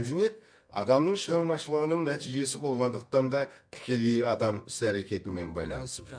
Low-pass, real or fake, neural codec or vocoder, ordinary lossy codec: 9.9 kHz; fake; codec, 24 kHz, 0.9 kbps, WavTokenizer, medium music audio release; MP3, 64 kbps